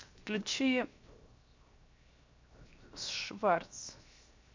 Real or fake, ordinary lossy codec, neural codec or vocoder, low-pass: fake; MP3, 64 kbps; codec, 16 kHz, 0.7 kbps, FocalCodec; 7.2 kHz